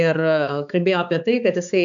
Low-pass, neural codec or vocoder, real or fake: 7.2 kHz; codec, 16 kHz, 4 kbps, X-Codec, HuBERT features, trained on balanced general audio; fake